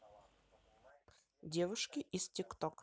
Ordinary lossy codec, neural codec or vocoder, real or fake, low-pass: none; none; real; none